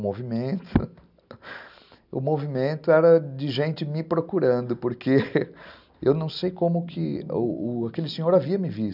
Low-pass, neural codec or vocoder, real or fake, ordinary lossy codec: 5.4 kHz; none; real; none